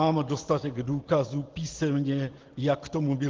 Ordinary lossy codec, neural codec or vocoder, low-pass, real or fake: Opus, 16 kbps; none; 7.2 kHz; real